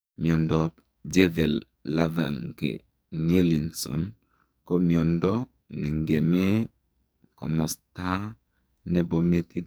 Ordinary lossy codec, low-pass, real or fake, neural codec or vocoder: none; none; fake; codec, 44.1 kHz, 2.6 kbps, SNAC